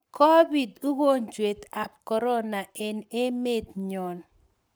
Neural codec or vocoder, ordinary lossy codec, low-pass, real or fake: codec, 44.1 kHz, 7.8 kbps, Pupu-Codec; none; none; fake